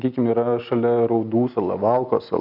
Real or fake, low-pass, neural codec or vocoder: real; 5.4 kHz; none